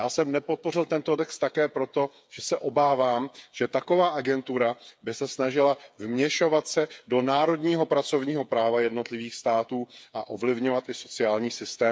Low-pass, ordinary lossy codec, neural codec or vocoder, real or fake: none; none; codec, 16 kHz, 8 kbps, FreqCodec, smaller model; fake